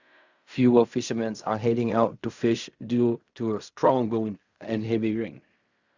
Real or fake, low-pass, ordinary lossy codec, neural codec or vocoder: fake; 7.2 kHz; Opus, 64 kbps; codec, 16 kHz in and 24 kHz out, 0.4 kbps, LongCat-Audio-Codec, fine tuned four codebook decoder